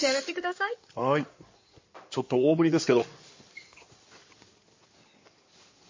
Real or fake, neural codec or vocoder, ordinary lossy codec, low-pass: fake; codec, 16 kHz in and 24 kHz out, 2.2 kbps, FireRedTTS-2 codec; MP3, 32 kbps; 7.2 kHz